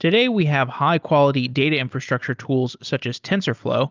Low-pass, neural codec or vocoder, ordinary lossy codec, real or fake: 7.2 kHz; none; Opus, 24 kbps; real